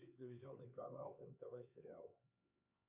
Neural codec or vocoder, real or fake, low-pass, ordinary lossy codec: codec, 16 kHz, 4 kbps, X-Codec, HuBERT features, trained on LibriSpeech; fake; 3.6 kHz; Opus, 64 kbps